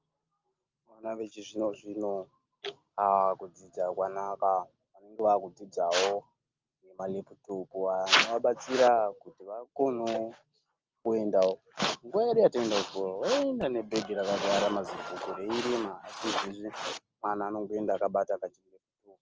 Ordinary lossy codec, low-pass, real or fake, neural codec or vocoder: Opus, 24 kbps; 7.2 kHz; real; none